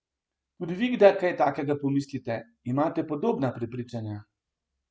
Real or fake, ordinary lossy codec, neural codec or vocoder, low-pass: real; none; none; none